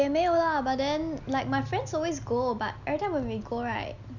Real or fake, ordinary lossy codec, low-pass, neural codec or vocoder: real; none; 7.2 kHz; none